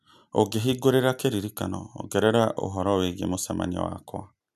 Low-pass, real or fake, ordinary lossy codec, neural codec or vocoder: 14.4 kHz; real; none; none